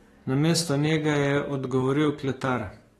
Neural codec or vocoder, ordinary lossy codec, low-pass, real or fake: codec, 44.1 kHz, 7.8 kbps, DAC; AAC, 32 kbps; 19.8 kHz; fake